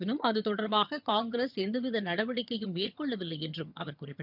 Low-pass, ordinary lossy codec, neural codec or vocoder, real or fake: 5.4 kHz; none; vocoder, 22.05 kHz, 80 mel bands, HiFi-GAN; fake